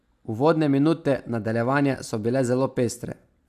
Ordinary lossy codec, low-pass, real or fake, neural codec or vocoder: AAC, 96 kbps; 14.4 kHz; real; none